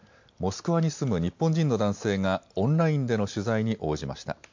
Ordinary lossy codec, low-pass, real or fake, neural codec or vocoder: MP3, 48 kbps; 7.2 kHz; real; none